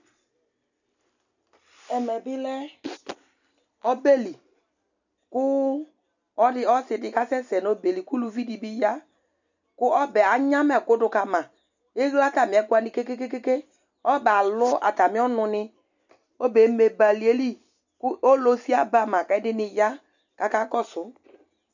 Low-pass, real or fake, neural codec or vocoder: 7.2 kHz; real; none